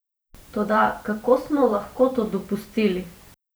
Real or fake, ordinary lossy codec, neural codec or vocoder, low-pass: fake; none; vocoder, 44.1 kHz, 128 mel bands every 512 samples, BigVGAN v2; none